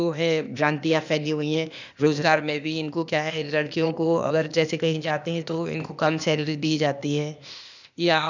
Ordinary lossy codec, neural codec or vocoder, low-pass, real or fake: none; codec, 16 kHz, 0.8 kbps, ZipCodec; 7.2 kHz; fake